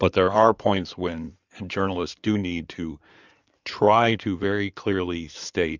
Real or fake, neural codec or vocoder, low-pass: fake; codec, 16 kHz in and 24 kHz out, 2.2 kbps, FireRedTTS-2 codec; 7.2 kHz